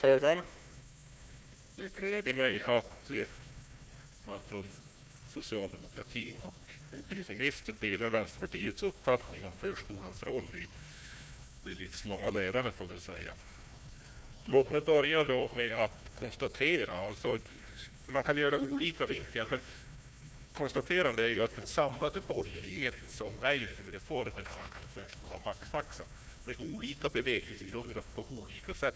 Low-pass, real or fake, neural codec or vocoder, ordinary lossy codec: none; fake; codec, 16 kHz, 1 kbps, FunCodec, trained on Chinese and English, 50 frames a second; none